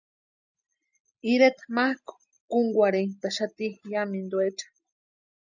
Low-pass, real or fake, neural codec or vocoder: 7.2 kHz; real; none